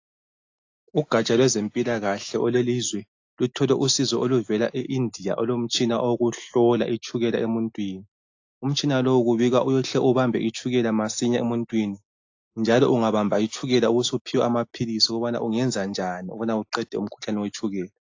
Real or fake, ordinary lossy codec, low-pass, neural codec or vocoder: real; AAC, 48 kbps; 7.2 kHz; none